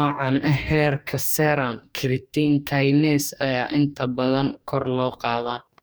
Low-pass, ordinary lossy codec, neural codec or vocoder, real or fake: none; none; codec, 44.1 kHz, 2.6 kbps, DAC; fake